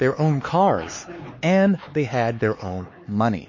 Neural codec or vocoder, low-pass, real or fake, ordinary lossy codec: codec, 16 kHz, 4 kbps, X-Codec, HuBERT features, trained on LibriSpeech; 7.2 kHz; fake; MP3, 32 kbps